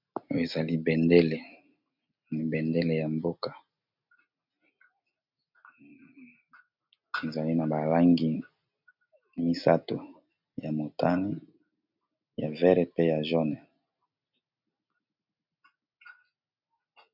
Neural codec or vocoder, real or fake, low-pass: none; real; 5.4 kHz